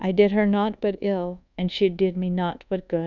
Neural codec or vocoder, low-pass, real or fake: codec, 24 kHz, 1.2 kbps, DualCodec; 7.2 kHz; fake